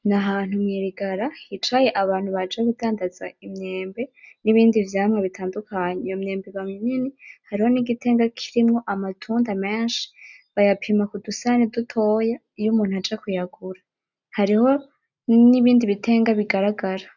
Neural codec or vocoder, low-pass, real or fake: none; 7.2 kHz; real